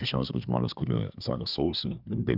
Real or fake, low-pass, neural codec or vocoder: fake; 5.4 kHz; codec, 24 kHz, 1 kbps, SNAC